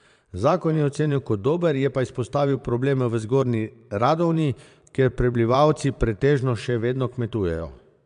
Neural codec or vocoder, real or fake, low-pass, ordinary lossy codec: vocoder, 22.05 kHz, 80 mel bands, Vocos; fake; 9.9 kHz; AAC, 96 kbps